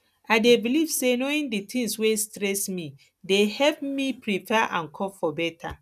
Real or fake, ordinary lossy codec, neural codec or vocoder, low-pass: real; none; none; 14.4 kHz